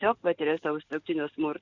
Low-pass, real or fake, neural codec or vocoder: 7.2 kHz; real; none